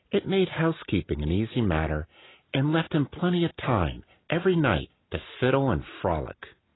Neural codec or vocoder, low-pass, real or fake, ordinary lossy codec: none; 7.2 kHz; real; AAC, 16 kbps